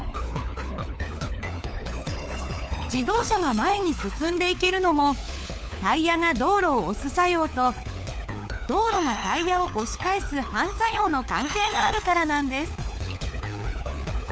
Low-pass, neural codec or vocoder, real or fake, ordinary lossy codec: none; codec, 16 kHz, 4 kbps, FunCodec, trained on LibriTTS, 50 frames a second; fake; none